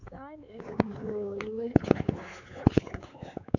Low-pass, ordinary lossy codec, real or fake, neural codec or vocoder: 7.2 kHz; none; fake; codec, 16 kHz, 4 kbps, X-Codec, WavLM features, trained on Multilingual LibriSpeech